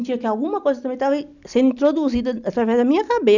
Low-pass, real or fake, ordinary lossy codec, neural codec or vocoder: 7.2 kHz; real; none; none